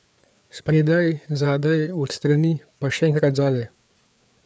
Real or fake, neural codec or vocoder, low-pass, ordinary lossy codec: fake; codec, 16 kHz, 4 kbps, FreqCodec, larger model; none; none